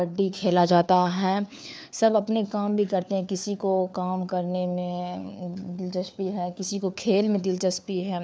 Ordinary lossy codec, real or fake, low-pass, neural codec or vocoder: none; fake; none; codec, 16 kHz, 4 kbps, FunCodec, trained on LibriTTS, 50 frames a second